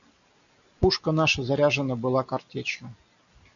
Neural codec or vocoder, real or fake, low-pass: none; real; 7.2 kHz